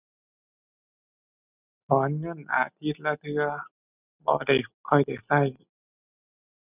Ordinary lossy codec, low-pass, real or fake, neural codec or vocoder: none; 3.6 kHz; real; none